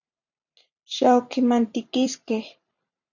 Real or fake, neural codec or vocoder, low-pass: fake; vocoder, 44.1 kHz, 128 mel bands every 512 samples, BigVGAN v2; 7.2 kHz